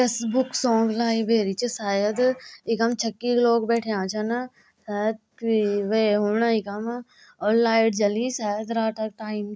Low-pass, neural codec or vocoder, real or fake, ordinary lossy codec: none; none; real; none